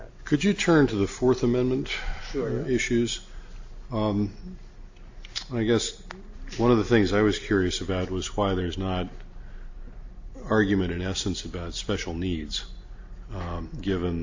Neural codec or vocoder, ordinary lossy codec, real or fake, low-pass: none; AAC, 48 kbps; real; 7.2 kHz